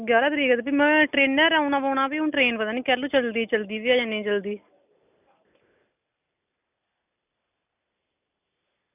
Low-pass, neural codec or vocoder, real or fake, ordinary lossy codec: 3.6 kHz; none; real; none